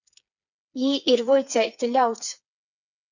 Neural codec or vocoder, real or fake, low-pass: codec, 16 kHz, 4 kbps, FreqCodec, smaller model; fake; 7.2 kHz